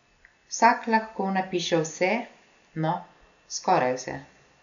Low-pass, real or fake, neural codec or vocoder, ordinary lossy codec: 7.2 kHz; real; none; none